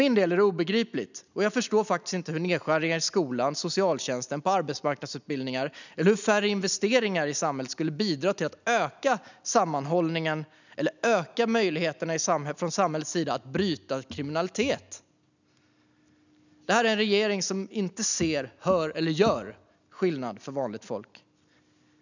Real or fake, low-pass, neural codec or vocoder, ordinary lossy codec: real; 7.2 kHz; none; none